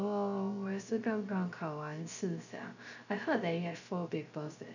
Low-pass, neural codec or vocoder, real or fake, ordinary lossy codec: 7.2 kHz; codec, 16 kHz, 0.3 kbps, FocalCodec; fake; none